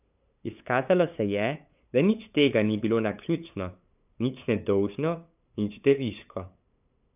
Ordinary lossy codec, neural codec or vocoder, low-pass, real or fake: none; codec, 16 kHz, 8 kbps, FunCodec, trained on LibriTTS, 25 frames a second; 3.6 kHz; fake